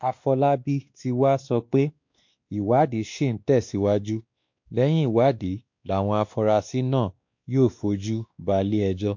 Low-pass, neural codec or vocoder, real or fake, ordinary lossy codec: 7.2 kHz; codec, 16 kHz, 2 kbps, X-Codec, WavLM features, trained on Multilingual LibriSpeech; fake; MP3, 48 kbps